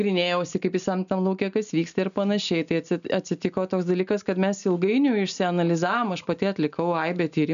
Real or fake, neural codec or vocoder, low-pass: real; none; 7.2 kHz